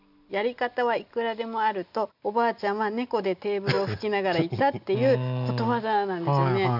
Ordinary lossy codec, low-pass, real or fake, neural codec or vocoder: none; 5.4 kHz; real; none